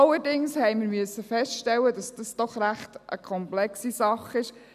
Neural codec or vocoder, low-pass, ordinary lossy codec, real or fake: none; 14.4 kHz; none; real